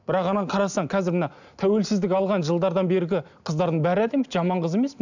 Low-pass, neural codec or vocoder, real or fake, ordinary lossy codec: 7.2 kHz; none; real; none